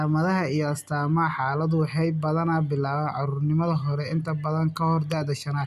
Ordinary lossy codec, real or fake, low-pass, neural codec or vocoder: none; real; 14.4 kHz; none